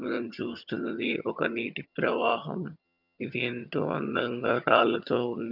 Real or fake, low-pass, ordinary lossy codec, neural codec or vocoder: fake; 5.4 kHz; none; vocoder, 22.05 kHz, 80 mel bands, HiFi-GAN